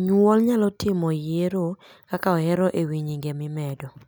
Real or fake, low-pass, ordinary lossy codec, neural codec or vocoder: real; none; none; none